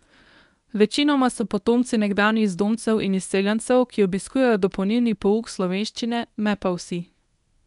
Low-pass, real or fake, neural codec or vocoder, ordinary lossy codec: 10.8 kHz; fake; codec, 24 kHz, 0.9 kbps, WavTokenizer, medium speech release version 1; none